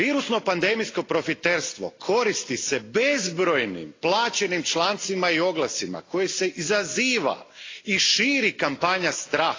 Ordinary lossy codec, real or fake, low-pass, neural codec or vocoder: AAC, 32 kbps; real; 7.2 kHz; none